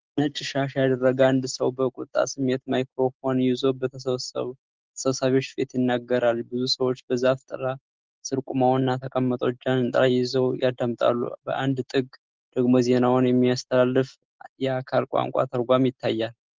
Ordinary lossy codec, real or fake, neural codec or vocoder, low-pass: Opus, 16 kbps; real; none; 7.2 kHz